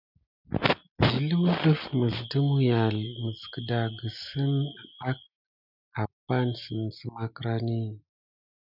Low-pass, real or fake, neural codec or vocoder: 5.4 kHz; real; none